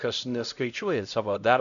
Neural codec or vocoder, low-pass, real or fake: codec, 16 kHz, 0.5 kbps, X-Codec, HuBERT features, trained on LibriSpeech; 7.2 kHz; fake